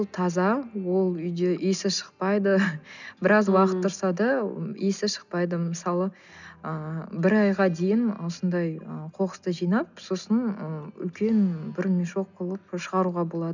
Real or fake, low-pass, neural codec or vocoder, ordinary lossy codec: real; 7.2 kHz; none; none